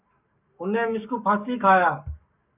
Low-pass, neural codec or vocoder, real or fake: 3.6 kHz; codec, 44.1 kHz, 7.8 kbps, Pupu-Codec; fake